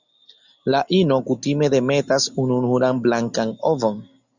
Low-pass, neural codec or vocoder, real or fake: 7.2 kHz; none; real